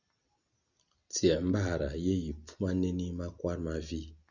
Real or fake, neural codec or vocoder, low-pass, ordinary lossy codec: real; none; 7.2 kHz; Opus, 64 kbps